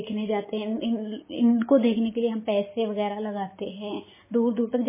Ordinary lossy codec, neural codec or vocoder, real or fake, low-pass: MP3, 16 kbps; none; real; 3.6 kHz